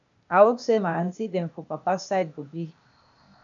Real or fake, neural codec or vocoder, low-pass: fake; codec, 16 kHz, 0.8 kbps, ZipCodec; 7.2 kHz